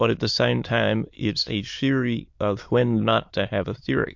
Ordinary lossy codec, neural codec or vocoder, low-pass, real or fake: MP3, 48 kbps; autoencoder, 22.05 kHz, a latent of 192 numbers a frame, VITS, trained on many speakers; 7.2 kHz; fake